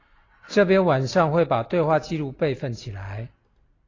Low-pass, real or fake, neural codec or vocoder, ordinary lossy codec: 7.2 kHz; real; none; AAC, 32 kbps